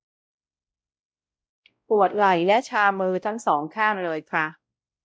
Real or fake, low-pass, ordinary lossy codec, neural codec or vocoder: fake; none; none; codec, 16 kHz, 0.5 kbps, X-Codec, WavLM features, trained on Multilingual LibriSpeech